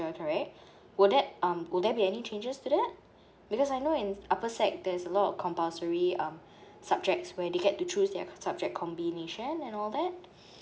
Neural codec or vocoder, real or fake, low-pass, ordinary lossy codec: none; real; none; none